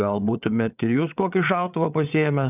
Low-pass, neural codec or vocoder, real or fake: 3.6 kHz; codec, 16 kHz, 8 kbps, FreqCodec, larger model; fake